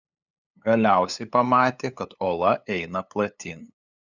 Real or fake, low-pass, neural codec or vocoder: fake; 7.2 kHz; codec, 16 kHz, 8 kbps, FunCodec, trained on LibriTTS, 25 frames a second